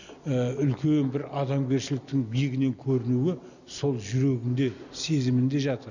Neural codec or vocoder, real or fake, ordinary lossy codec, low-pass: none; real; none; 7.2 kHz